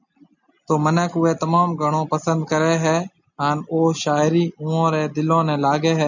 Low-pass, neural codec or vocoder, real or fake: 7.2 kHz; none; real